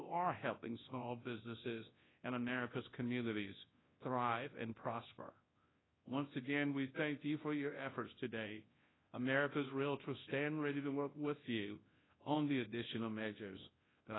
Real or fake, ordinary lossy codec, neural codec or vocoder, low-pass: fake; AAC, 16 kbps; codec, 24 kHz, 0.9 kbps, WavTokenizer, large speech release; 7.2 kHz